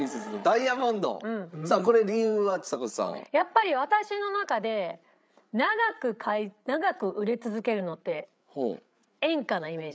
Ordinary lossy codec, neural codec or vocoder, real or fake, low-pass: none; codec, 16 kHz, 8 kbps, FreqCodec, larger model; fake; none